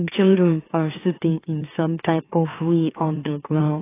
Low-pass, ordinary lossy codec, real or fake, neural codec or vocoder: 3.6 kHz; AAC, 16 kbps; fake; autoencoder, 44.1 kHz, a latent of 192 numbers a frame, MeloTTS